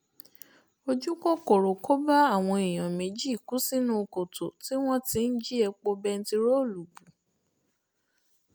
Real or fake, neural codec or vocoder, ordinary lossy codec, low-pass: real; none; none; none